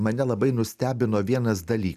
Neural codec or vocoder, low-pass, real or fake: none; 14.4 kHz; real